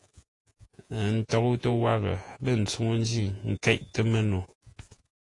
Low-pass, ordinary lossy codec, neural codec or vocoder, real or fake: 10.8 kHz; AAC, 48 kbps; vocoder, 48 kHz, 128 mel bands, Vocos; fake